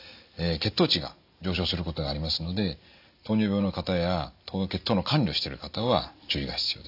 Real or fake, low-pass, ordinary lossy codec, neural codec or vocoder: real; 5.4 kHz; AAC, 48 kbps; none